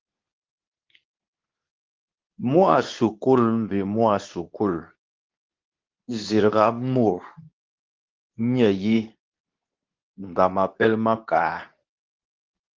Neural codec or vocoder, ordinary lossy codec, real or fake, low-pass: codec, 24 kHz, 0.9 kbps, WavTokenizer, medium speech release version 2; Opus, 32 kbps; fake; 7.2 kHz